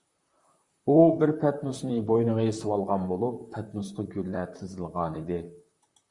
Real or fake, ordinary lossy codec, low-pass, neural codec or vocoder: fake; Opus, 64 kbps; 10.8 kHz; vocoder, 44.1 kHz, 128 mel bands, Pupu-Vocoder